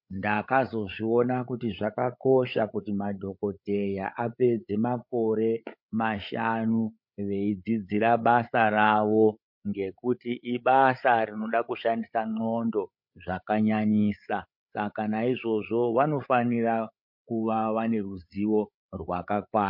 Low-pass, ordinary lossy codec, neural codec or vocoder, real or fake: 5.4 kHz; MP3, 48 kbps; codec, 16 kHz, 8 kbps, FreqCodec, larger model; fake